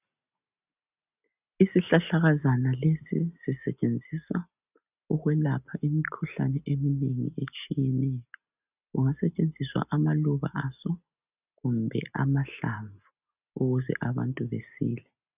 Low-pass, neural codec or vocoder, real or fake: 3.6 kHz; none; real